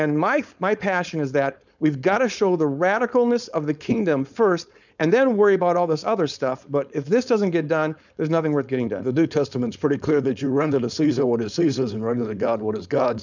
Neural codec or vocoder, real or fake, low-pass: codec, 16 kHz, 4.8 kbps, FACodec; fake; 7.2 kHz